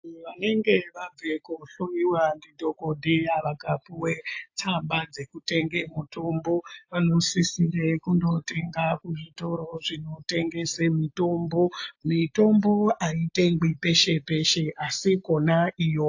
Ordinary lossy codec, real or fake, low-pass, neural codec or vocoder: AAC, 48 kbps; real; 7.2 kHz; none